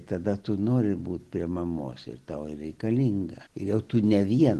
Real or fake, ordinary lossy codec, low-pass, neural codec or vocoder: real; Opus, 16 kbps; 10.8 kHz; none